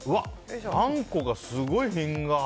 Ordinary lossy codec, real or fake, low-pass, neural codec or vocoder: none; real; none; none